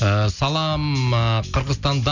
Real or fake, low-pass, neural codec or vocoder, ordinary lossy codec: real; 7.2 kHz; none; none